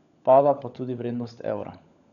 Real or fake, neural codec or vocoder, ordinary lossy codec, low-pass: fake; codec, 16 kHz, 16 kbps, FunCodec, trained on LibriTTS, 50 frames a second; none; 7.2 kHz